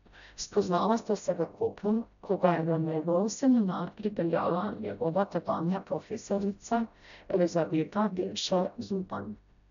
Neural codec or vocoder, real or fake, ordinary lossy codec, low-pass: codec, 16 kHz, 0.5 kbps, FreqCodec, smaller model; fake; none; 7.2 kHz